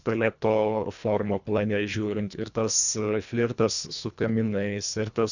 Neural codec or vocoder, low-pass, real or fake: codec, 24 kHz, 1.5 kbps, HILCodec; 7.2 kHz; fake